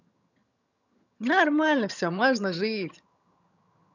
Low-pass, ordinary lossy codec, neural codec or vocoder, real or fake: 7.2 kHz; none; vocoder, 22.05 kHz, 80 mel bands, HiFi-GAN; fake